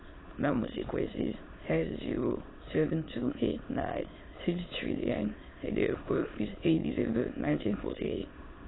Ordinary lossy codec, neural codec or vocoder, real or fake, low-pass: AAC, 16 kbps; autoencoder, 22.05 kHz, a latent of 192 numbers a frame, VITS, trained on many speakers; fake; 7.2 kHz